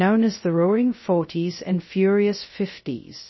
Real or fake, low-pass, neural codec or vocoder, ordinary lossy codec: fake; 7.2 kHz; codec, 16 kHz, 0.2 kbps, FocalCodec; MP3, 24 kbps